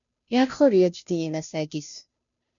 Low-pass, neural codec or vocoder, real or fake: 7.2 kHz; codec, 16 kHz, 0.5 kbps, FunCodec, trained on Chinese and English, 25 frames a second; fake